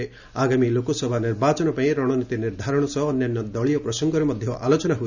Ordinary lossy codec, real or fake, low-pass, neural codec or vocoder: none; real; 7.2 kHz; none